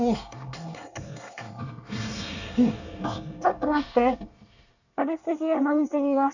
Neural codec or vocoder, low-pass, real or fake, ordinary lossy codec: codec, 24 kHz, 1 kbps, SNAC; 7.2 kHz; fake; none